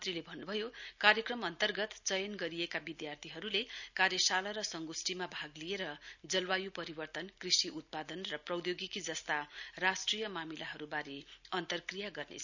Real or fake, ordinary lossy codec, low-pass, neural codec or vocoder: real; none; 7.2 kHz; none